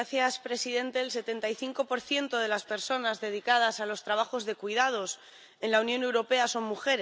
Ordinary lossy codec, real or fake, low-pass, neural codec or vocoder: none; real; none; none